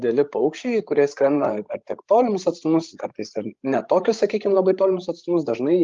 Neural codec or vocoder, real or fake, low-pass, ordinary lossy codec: codec, 16 kHz, 16 kbps, FreqCodec, larger model; fake; 7.2 kHz; Opus, 32 kbps